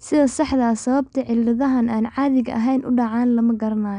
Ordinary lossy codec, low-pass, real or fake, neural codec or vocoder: none; 9.9 kHz; real; none